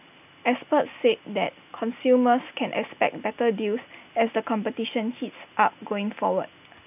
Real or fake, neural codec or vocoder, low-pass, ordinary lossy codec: real; none; 3.6 kHz; none